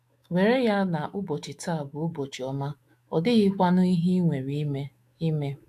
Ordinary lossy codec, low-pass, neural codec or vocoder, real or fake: AAC, 64 kbps; 14.4 kHz; autoencoder, 48 kHz, 128 numbers a frame, DAC-VAE, trained on Japanese speech; fake